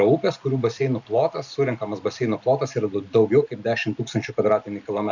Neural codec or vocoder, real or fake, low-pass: none; real; 7.2 kHz